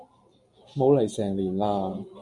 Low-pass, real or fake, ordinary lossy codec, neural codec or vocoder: 10.8 kHz; real; AAC, 64 kbps; none